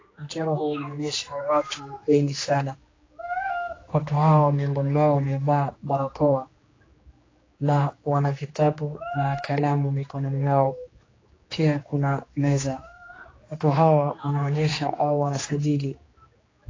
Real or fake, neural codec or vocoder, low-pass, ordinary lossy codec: fake; codec, 16 kHz, 2 kbps, X-Codec, HuBERT features, trained on general audio; 7.2 kHz; AAC, 32 kbps